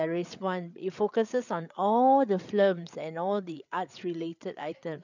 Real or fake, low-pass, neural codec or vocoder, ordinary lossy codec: fake; 7.2 kHz; codec, 16 kHz, 8 kbps, FreqCodec, larger model; none